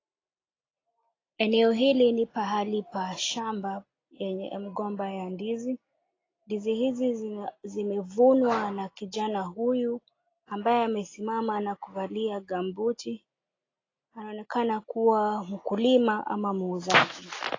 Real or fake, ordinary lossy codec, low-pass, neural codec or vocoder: real; AAC, 32 kbps; 7.2 kHz; none